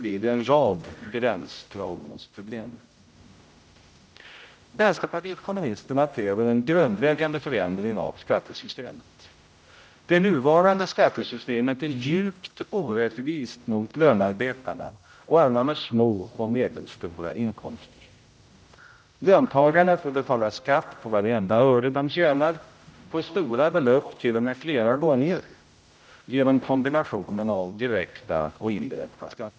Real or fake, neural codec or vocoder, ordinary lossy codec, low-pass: fake; codec, 16 kHz, 0.5 kbps, X-Codec, HuBERT features, trained on general audio; none; none